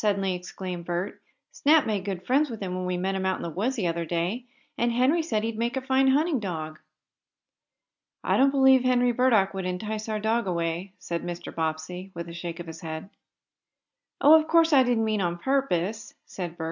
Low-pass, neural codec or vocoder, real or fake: 7.2 kHz; none; real